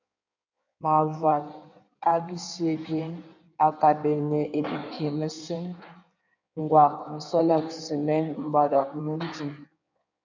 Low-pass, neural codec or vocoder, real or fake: 7.2 kHz; codec, 16 kHz in and 24 kHz out, 1.1 kbps, FireRedTTS-2 codec; fake